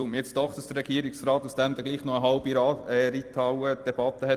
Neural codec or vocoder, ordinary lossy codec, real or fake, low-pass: vocoder, 44.1 kHz, 128 mel bands every 256 samples, BigVGAN v2; Opus, 32 kbps; fake; 14.4 kHz